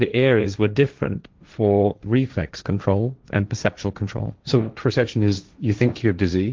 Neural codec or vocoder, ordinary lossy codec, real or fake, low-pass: codec, 16 kHz, 1.1 kbps, Voila-Tokenizer; Opus, 32 kbps; fake; 7.2 kHz